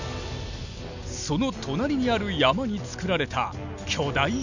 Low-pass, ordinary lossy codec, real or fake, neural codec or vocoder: 7.2 kHz; none; real; none